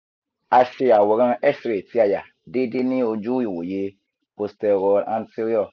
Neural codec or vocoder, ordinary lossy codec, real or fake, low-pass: none; none; real; 7.2 kHz